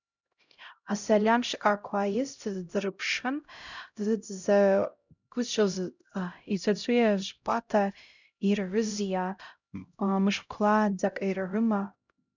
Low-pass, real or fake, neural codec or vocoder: 7.2 kHz; fake; codec, 16 kHz, 0.5 kbps, X-Codec, HuBERT features, trained on LibriSpeech